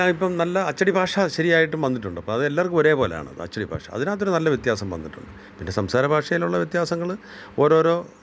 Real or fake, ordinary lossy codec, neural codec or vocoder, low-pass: real; none; none; none